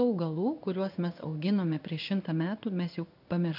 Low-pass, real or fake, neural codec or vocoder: 5.4 kHz; fake; codec, 16 kHz in and 24 kHz out, 1 kbps, XY-Tokenizer